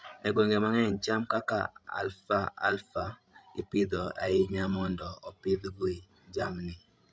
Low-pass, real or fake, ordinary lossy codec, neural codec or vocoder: none; fake; none; codec, 16 kHz, 16 kbps, FreqCodec, larger model